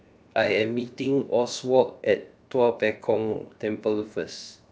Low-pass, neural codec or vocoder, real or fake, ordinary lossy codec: none; codec, 16 kHz, 0.7 kbps, FocalCodec; fake; none